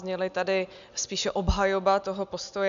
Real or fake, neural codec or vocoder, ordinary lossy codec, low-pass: real; none; AAC, 96 kbps; 7.2 kHz